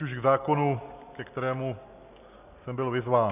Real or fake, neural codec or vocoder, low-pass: real; none; 3.6 kHz